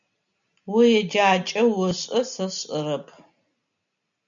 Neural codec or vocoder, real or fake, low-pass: none; real; 7.2 kHz